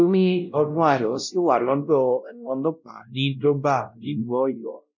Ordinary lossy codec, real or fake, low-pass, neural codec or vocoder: none; fake; 7.2 kHz; codec, 16 kHz, 0.5 kbps, X-Codec, WavLM features, trained on Multilingual LibriSpeech